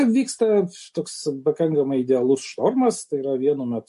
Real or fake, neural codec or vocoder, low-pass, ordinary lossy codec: real; none; 14.4 kHz; MP3, 48 kbps